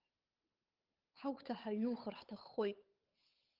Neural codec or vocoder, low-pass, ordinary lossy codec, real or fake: codec, 16 kHz, 8 kbps, FunCodec, trained on Chinese and English, 25 frames a second; 5.4 kHz; Opus, 32 kbps; fake